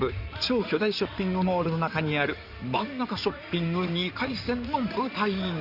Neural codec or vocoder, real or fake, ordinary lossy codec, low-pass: codec, 16 kHz in and 24 kHz out, 2.2 kbps, FireRedTTS-2 codec; fake; none; 5.4 kHz